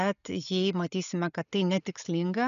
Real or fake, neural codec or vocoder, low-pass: real; none; 7.2 kHz